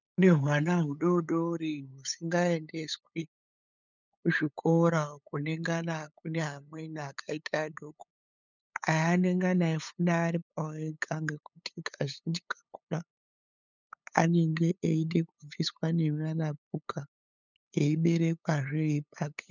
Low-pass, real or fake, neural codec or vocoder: 7.2 kHz; fake; codec, 16 kHz, 8 kbps, FunCodec, trained on LibriTTS, 25 frames a second